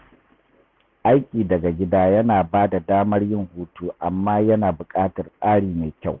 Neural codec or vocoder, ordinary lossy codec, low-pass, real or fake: none; Opus, 64 kbps; 7.2 kHz; real